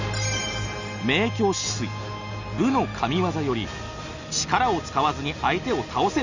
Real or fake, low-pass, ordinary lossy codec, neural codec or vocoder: real; 7.2 kHz; Opus, 64 kbps; none